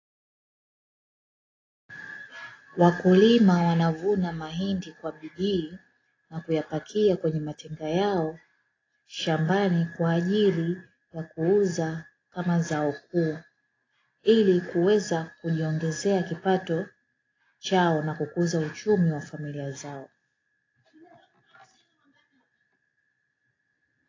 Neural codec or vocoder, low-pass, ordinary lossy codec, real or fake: none; 7.2 kHz; AAC, 32 kbps; real